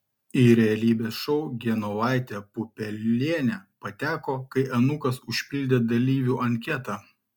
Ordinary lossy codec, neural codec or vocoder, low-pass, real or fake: MP3, 96 kbps; none; 19.8 kHz; real